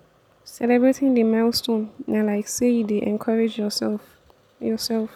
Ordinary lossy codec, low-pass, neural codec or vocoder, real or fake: none; 19.8 kHz; none; real